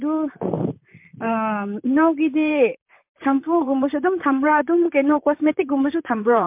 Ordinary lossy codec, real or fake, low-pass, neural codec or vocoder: MP3, 32 kbps; fake; 3.6 kHz; vocoder, 44.1 kHz, 128 mel bands, Pupu-Vocoder